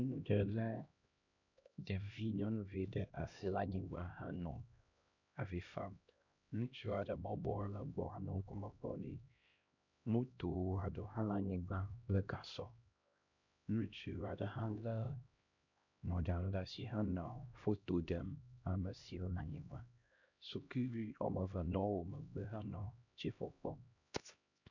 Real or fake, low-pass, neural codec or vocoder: fake; 7.2 kHz; codec, 16 kHz, 1 kbps, X-Codec, HuBERT features, trained on LibriSpeech